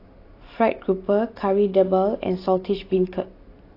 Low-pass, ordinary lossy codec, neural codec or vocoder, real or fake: 5.4 kHz; AAC, 32 kbps; none; real